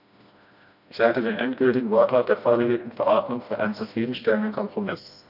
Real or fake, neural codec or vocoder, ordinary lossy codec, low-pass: fake; codec, 16 kHz, 1 kbps, FreqCodec, smaller model; none; 5.4 kHz